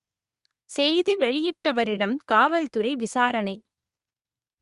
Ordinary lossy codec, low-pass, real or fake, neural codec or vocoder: Opus, 64 kbps; 10.8 kHz; fake; codec, 24 kHz, 1 kbps, SNAC